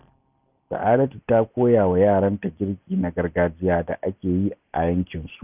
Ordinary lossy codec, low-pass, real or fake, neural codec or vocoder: none; 3.6 kHz; real; none